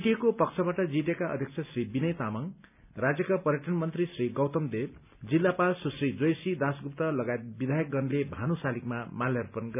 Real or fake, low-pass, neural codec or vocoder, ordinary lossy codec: real; 3.6 kHz; none; none